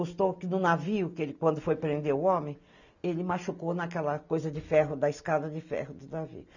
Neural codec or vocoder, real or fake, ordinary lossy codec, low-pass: none; real; none; 7.2 kHz